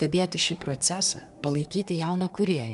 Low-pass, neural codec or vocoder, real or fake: 10.8 kHz; codec, 24 kHz, 1 kbps, SNAC; fake